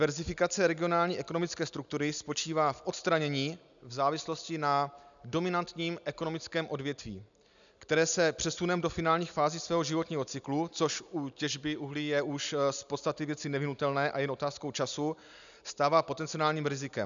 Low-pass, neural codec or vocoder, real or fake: 7.2 kHz; none; real